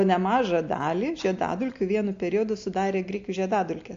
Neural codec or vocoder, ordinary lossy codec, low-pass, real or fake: none; AAC, 48 kbps; 7.2 kHz; real